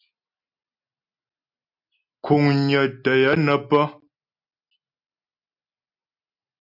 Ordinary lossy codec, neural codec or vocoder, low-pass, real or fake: MP3, 48 kbps; none; 5.4 kHz; real